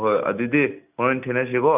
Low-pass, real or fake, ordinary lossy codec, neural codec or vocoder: 3.6 kHz; real; none; none